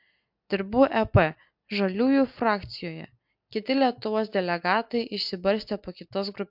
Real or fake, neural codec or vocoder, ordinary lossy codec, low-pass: real; none; MP3, 48 kbps; 5.4 kHz